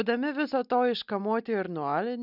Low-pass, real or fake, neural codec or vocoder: 5.4 kHz; real; none